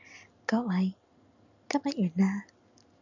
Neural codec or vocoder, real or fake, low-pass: none; real; 7.2 kHz